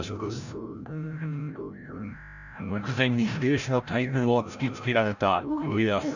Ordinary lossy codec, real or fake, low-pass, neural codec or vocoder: none; fake; 7.2 kHz; codec, 16 kHz, 0.5 kbps, FreqCodec, larger model